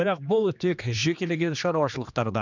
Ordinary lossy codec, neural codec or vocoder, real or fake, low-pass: none; codec, 16 kHz, 2 kbps, X-Codec, HuBERT features, trained on general audio; fake; 7.2 kHz